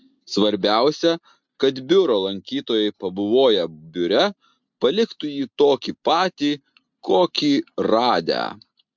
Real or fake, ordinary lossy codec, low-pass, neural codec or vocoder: real; MP3, 64 kbps; 7.2 kHz; none